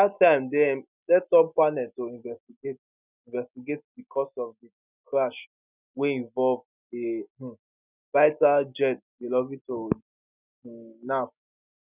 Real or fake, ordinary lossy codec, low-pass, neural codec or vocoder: real; none; 3.6 kHz; none